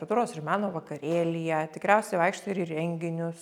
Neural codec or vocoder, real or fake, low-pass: none; real; 19.8 kHz